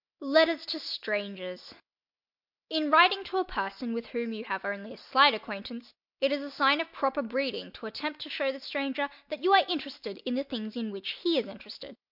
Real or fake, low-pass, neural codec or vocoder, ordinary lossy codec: real; 5.4 kHz; none; MP3, 48 kbps